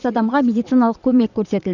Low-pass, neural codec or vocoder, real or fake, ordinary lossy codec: 7.2 kHz; vocoder, 22.05 kHz, 80 mel bands, WaveNeXt; fake; none